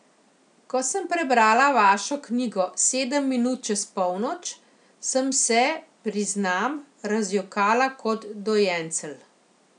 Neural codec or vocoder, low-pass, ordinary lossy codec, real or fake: none; 9.9 kHz; none; real